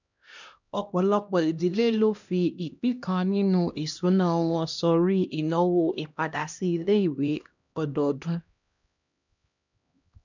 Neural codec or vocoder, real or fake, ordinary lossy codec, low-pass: codec, 16 kHz, 1 kbps, X-Codec, HuBERT features, trained on LibriSpeech; fake; none; 7.2 kHz